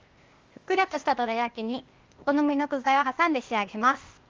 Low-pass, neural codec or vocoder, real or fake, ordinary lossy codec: 7.2 kHz; codec, 16 kHz, 0.8 kbps, ZipCodec; fake; Opus, 32 kbps